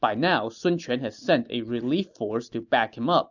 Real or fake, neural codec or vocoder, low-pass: real; none; 7.2 kHz